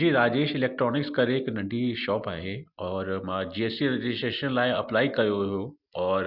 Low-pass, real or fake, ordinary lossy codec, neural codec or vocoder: 5.4 kHz; real; Opus, 64 kbps; none